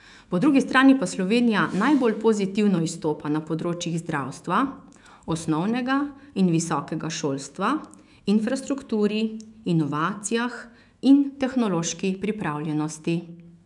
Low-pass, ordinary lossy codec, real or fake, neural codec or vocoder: 10.8 kHz; none; fake; autoencoder, 48 kHz, 128 numbers a frame, DAC-VAE, trained on Japanese speech